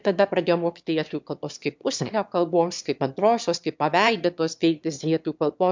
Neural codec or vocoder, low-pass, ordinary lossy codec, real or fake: autoencoder, 22.05 kHz, a latent of 192 numbers a frame, VITS, trained on one speaker; 7.2 kHz; MP3, 64 kbps; fake